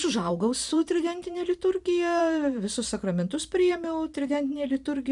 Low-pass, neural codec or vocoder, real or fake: 10.8 kHz; vocoder, 44.1 kHz, 128 mel bands every 512 samples, BigVGAN v2; fake